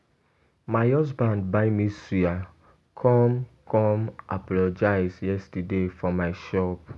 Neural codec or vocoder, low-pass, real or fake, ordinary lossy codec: none; none; real; none